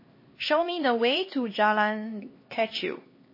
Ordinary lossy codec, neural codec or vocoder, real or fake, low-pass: MP3, 24 kbps; codec, 16 kHz, 2 kbps, X-Codec, HuBERT features, trained on LibriSpeech; fake; 5.4 kHz